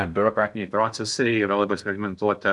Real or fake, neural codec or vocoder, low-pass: fake; codec, 16 kHz in and 24 kHz out, 0.6 kbps, FocalCodec, streaming, 4096 codes; 10.8 kHz